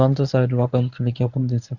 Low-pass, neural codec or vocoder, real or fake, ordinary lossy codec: 7.2 kHz; codec, 24 kHz, 0.9 kbps, WavTokenizer, medium speech release version 1; fake; none